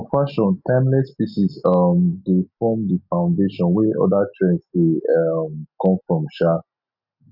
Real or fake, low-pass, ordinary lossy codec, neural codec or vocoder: real; 5.4 kHz; none; none